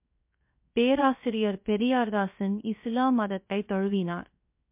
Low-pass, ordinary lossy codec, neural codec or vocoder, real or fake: 3.6 kHz; MP3, 32 kbps; codec, 16 kHz, 0.7 kbps, FocalCodec; fake